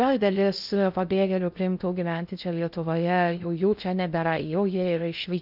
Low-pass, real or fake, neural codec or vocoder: 5.4 kHz; fake; codec, 16 kHz in and 24 kHz out, 0.6 kbps, FocalCodec, streaming, 4096 codes